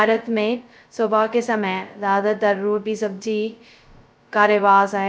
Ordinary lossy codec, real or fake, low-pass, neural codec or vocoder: none; fake; none; codec, 16 kHz, 0.2 kbps, FocalCodec